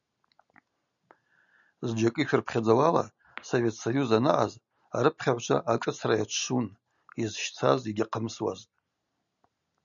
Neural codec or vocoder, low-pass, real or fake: none; 7.2 kHz; real